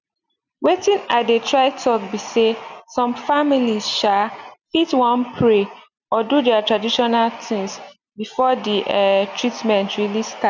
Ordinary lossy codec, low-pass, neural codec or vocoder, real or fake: none; 7.2 kHz; none; real